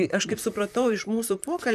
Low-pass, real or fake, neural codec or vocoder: 14.4 kHz; fake; vocoder, 44.1 kHz, 128 mel bands, Pupu-Vocoder